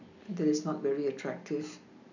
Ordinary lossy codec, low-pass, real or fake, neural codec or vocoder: none; 7.2 kHz; real; none